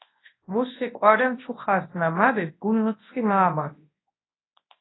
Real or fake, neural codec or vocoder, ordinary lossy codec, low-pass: fake; codec, 24 kHz, 0.9 kbps, WavTokenizer, large speech release; AAC, 16 kbps; 7.2 kHz